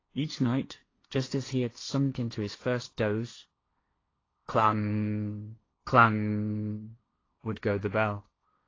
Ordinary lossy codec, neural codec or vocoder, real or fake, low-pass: AAC, 32 kbps; codec, 16 kHz, 1.1 kbps, Voila-Tokenizer; fake; 7.2 kHz